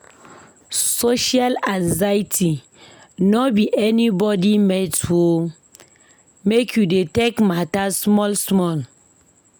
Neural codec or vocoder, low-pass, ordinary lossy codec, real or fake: none; none; none; real